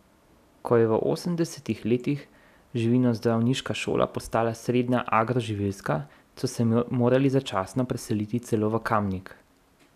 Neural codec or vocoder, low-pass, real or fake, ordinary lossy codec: none; 14.4 kHz; real; none